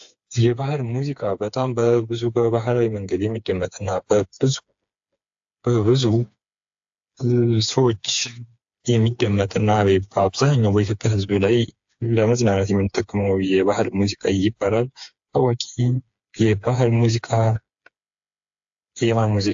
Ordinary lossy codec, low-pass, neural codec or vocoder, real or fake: AAC, 64 kbps; 7.2 kHz; codec, 16 kHz, 4 kbps, FreqCodec, smaller model; fake